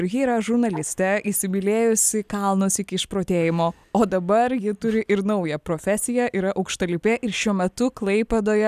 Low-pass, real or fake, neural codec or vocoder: 14.4 kHz; real; none